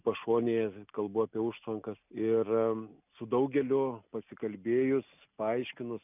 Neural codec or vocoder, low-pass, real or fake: none; 3.6 kHz; real